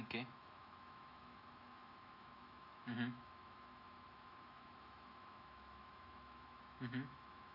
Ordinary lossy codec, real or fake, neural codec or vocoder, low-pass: none; fake; autoencoder, 48 kHz, 128 numbers a frame, DAC-VAE, trained on Japanese speech; 5.4 kHz